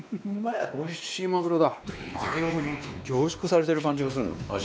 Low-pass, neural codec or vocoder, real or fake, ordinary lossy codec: none; codec, 16 kHz, 2 kbps, X-Codec, WavLM features, trained on Multilingual LibriSpeech; fake; none